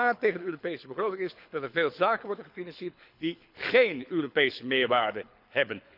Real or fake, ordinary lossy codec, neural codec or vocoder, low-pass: fake; none; codec, 24 kHz, 6 kbps, HILCodec; 5.4 kHz